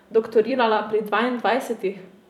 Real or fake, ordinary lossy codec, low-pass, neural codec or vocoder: fake; none; 19.8 kHz; vocoder, 44.1 kHz, 128 mel bands every 256 samples, BigVGAN v2